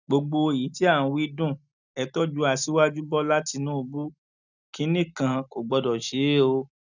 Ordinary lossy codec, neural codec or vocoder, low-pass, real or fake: none; none; 7.2 kHz; real